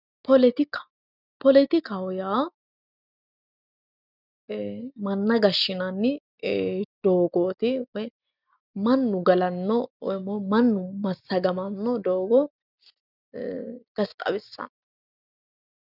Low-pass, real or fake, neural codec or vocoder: 5.4 kHz; real; none